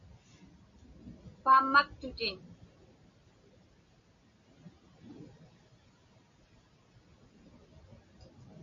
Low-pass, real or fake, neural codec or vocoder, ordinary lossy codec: 7.2 kHz; real; none; AAC, 64 kbps